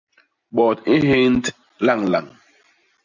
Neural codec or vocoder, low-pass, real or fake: none; 7.2 kHz; real